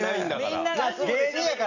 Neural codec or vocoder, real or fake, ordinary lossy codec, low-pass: vocoder, 44.1 kHz, 128 mel bands every 256 samples, BigVGAN v2; fake; none; 7.2 kHz